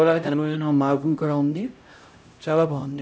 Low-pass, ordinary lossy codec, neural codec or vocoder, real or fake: none; none; codec, 16 kHz, 0.5 kbps, X-Codec, HuBERT features, trained on LibriSpeech; fake